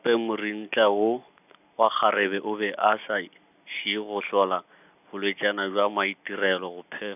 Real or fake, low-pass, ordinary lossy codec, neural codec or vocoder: real; 3.6 kHz; none; none